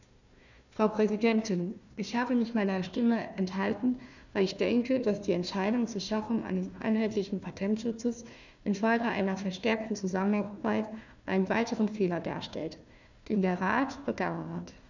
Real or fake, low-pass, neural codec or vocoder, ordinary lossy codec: fake; 7.2 kHz; codec, 16 kHz, 1 kbps, FunCodec, trained on Chinese and English, 50 frames a second; none